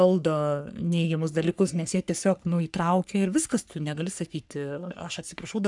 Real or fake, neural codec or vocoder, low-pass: fake; codec, 44.1 kHz, 3.4 kbps, Pupu-Codec; 10.8 kHz